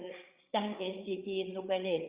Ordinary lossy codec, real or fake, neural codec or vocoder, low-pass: Opus, 64 kbps; fake; codec, 16 kHz, 8 kbps, FreqCodec, larger model; 3.6 kHz